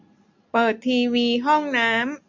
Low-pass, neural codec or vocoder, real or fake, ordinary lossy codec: 7.2 kHz; none; real; MP3, 64 kbps